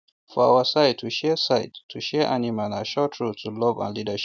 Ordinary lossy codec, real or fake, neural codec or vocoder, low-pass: none; real; none; none